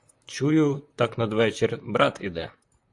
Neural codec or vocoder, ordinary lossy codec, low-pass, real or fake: vocoder, 44.1 kHz, 128 mel bands, Pupu-Vocoder; AAC, 64 kbps; 10.8 kHz; fake